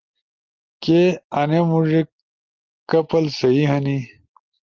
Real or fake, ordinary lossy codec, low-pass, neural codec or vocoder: real; Opus, 16 kbps; 7.2 kHz; none